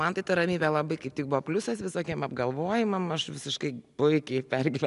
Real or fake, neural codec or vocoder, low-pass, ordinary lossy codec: real; none; 10.8 kHz; MP3, 96 kbps